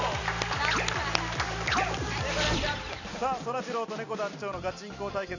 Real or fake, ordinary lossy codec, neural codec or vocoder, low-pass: real; none; none; 7.2 kHz